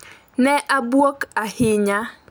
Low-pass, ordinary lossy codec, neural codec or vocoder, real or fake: none; none; none; real